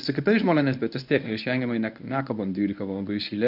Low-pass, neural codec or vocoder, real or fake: 5.4 kHz; codec, 24 kHz, 0.9 kbps, WavTokenizer, medium speech release version 1; fake